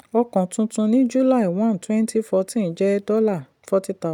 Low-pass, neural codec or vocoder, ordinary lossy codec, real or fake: 19.8 kHz; none; none; real